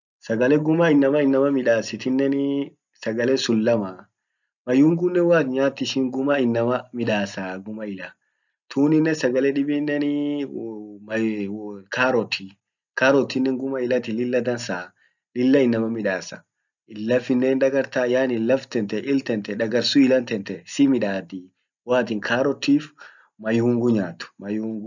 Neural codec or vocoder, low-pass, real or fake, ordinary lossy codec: none; 7.2 kHz; real; none